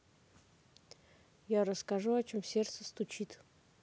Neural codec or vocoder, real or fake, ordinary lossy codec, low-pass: none; real; none; none